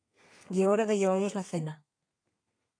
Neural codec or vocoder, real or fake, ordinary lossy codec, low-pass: codec, 32 kHz, 1.9 kbps, SNAC; fake; AAC, 48 kbps; 9.9 kHz